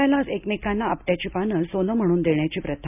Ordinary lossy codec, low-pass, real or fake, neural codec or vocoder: none; 3.6 kHz; real; none